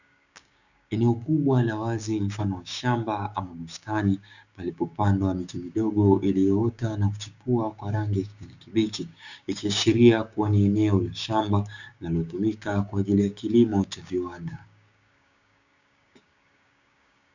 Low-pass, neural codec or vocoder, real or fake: 7.2 kHz; codec, 16 kHz, 6 kbps, DAC; fake